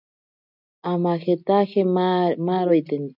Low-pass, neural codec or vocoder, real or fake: 5.4 kHz; none; real